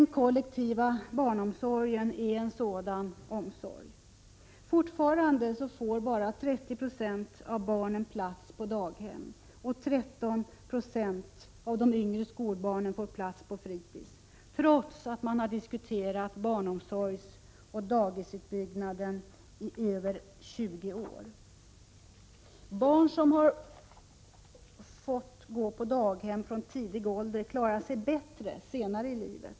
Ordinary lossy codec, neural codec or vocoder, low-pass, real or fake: none; none; none; real